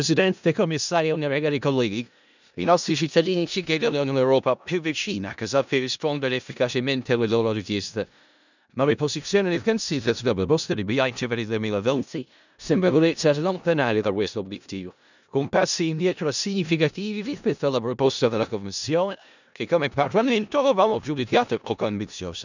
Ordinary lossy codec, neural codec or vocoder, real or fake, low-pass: none; codec, 16 kHz in and 24 kHz out, 0.4 kbps, LongCat-Audio-Codec, four codebook decoder; fake; 7.2 kHz